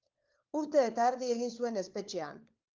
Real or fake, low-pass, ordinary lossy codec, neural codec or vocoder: fake; 7.2 kHz; Opus, 24 kbps; codec, 16 kHz, 4 kbps, FunCodec, trained on LibriTTS, 50 frames a second